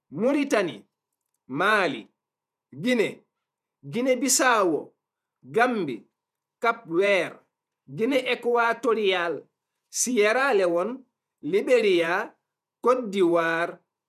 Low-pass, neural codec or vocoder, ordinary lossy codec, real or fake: 14.4 kHz; vocoder, 48 kHz, 128 mel bands, Vocos; none; fake